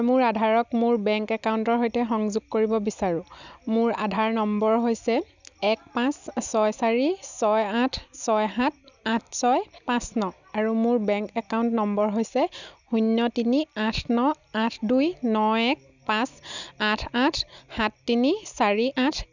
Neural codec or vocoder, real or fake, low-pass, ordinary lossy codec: none; real; 7.2 kHz; none